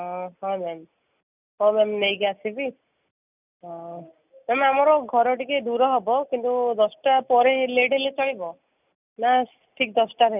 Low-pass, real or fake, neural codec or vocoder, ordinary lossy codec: 3.6 kHz; real; none; none